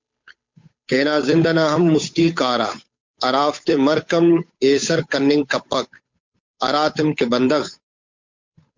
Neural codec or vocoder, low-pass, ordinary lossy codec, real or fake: codec, 16 kHz, 8 kbps, FunCodec, trained on Chinese and English, 25 frames a second; 7.2 kHz; MP3, 64 kbps; fake